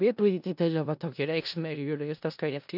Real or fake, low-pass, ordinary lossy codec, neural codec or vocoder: fake; 5.4 kHz; AAC, 48 kbps; codec, 16 kHz in and 24 kHz out, 0.4 kbps, LongCat-Audio-Codec, four codebook decoder